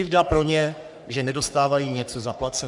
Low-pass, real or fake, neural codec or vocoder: 10.8 kHz; fake; codec, 44.1 kHz, 3.4 kbps, Pupu-Codec